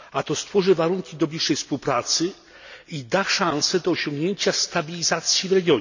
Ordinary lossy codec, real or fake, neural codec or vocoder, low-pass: none; real; none; 7.2 kHz